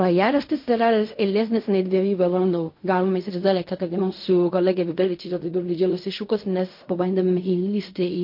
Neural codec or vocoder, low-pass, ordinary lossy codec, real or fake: codec, 16 kHz in and 24 kHz out, 0.4 kbps, LongCat-Audio-Codec, fine tuned four codebook decoder; 5.4 kHz; MP3, 32 kbps; fake